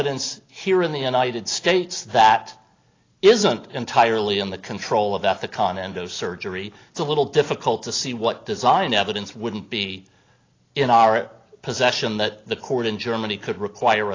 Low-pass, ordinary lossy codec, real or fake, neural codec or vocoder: 7.2 kHz; AAC, 32 kbps; real; none